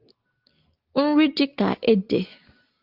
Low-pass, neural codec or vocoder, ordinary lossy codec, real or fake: 5.4 kHz; none; Opus, 24 kbps; real